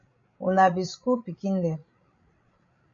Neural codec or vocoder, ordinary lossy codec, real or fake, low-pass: codec, 16 kHz, 16 kbps, FreqCodec, larger model; MP3, 64 kbps; fake; 7.2 kHz